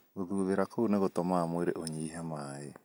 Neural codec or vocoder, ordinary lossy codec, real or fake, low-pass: none; none; real; none